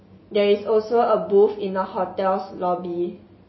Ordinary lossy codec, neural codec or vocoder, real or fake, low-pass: MP3, 24 kbps; none; real; 7.2 kHz